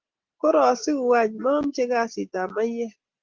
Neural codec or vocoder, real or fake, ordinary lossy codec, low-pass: none; real; Opus, 16 kbps; 7.2 kHz